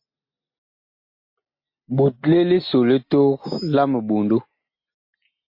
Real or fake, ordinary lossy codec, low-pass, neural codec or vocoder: real; MP3, 32 kbps; 5.4 kHz; none